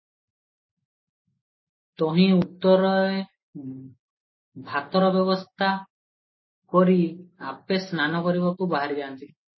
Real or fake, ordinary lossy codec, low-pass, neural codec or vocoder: real; MP3, 24 kbps; 7.2 kHz; none